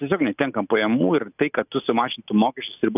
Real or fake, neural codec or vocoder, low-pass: real; none; 3.6 kHz